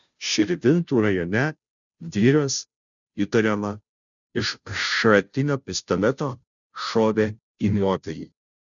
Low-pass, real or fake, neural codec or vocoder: 7.2 kHz; fake; codec, 16 kHz, 0.5 kbps, FunCodec, trained on Chinese and English, 25 frames a second